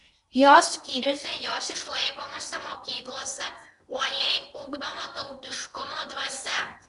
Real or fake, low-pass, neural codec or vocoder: fake; 10.8 kHz; codec, 16 kHz in and 24 kHz out, 0.8 kbps, FocalCodec, streaming, 65536 codes